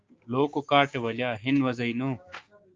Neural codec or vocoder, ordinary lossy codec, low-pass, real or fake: codec, 16 kHz, 6 kbps, DAC; Opus, 24 kbps; 7.2 kHz; fake